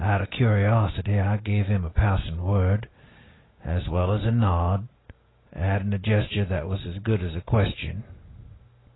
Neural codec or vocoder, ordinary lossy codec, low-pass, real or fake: none; AAC, 16 kbps; 7.2 kHz; real